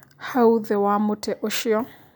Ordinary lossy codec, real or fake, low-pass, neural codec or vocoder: none; real; none; none